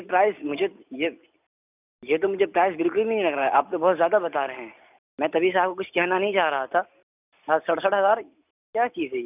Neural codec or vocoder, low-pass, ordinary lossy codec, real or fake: none; 3.6 kHz; none; real